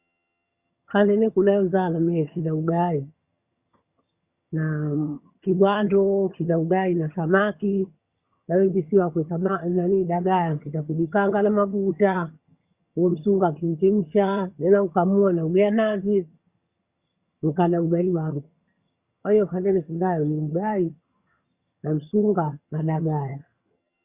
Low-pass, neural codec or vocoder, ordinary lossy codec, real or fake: 3.6 kHz; vocoder, 22.05 kHz, 80 mel bands, HiFi-GAN; Opus, 64 kbps; fake